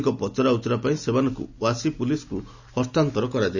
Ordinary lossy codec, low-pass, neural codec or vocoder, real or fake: none; 7.2 kHz; none; real